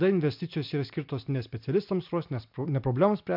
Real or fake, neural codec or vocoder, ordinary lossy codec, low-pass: real; none; MP3, 48 kbps; 5.4 kHz